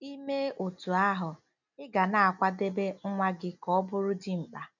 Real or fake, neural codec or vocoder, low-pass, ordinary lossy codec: real; none; 7.2 kHz; none